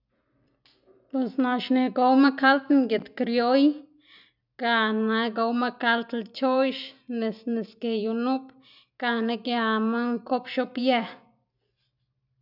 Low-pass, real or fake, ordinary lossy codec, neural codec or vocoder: 5.4 kHz; real; none; none